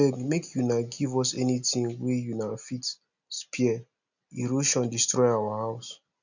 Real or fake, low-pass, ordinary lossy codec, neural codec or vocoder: real; 7.2 kHz; none; none